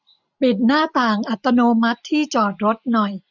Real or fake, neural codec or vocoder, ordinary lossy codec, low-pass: real; none; none; 7.2 kHz